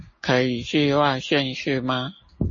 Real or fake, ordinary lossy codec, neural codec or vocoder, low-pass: real; MP3, 32 kbps; none; 7.2 kHz